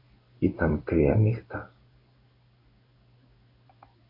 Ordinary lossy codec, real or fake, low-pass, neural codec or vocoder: MP3, 32 kbps; fake; 5.4 kHz; codec, 16 kHz in and 24 kHz out, 1 kbps, XY-Tokenizer